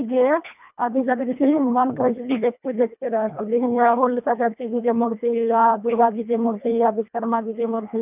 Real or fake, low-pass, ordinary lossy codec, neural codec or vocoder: fake; 3.6 kHz; none; codec, 24 kHz, 1.5 kbps, HILCodec